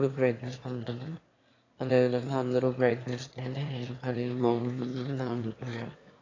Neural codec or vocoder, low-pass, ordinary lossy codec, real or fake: autoencoder, 22.05 kHz, a latent of 192 numbers a frame, VITS, trained on one speaker; 7.2 kHz; AAC, 32 kbps; fake